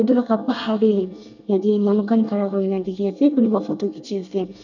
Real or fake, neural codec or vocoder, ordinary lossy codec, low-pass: fake; codec, 24 kHz, 1 kbps, SNAC; none; 7.2 kHz